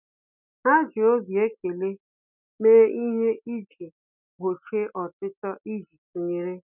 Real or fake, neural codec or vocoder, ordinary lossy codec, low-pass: real; none; none; 3.6 kHz